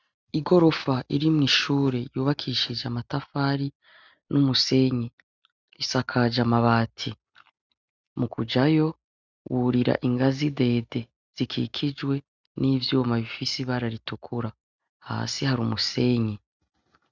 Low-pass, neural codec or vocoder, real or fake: 7.2 kHz; none; real